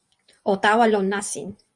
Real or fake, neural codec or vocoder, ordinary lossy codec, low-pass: fake; vocoder, 24 kHz, 100 mel bands, Vocos; Opus, 64 kbps; 10.8 kHz